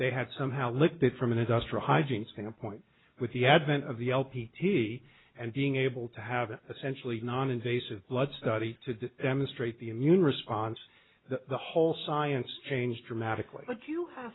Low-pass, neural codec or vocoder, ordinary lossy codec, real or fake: 7.2 kHz; none; AAC, 16 kbps; real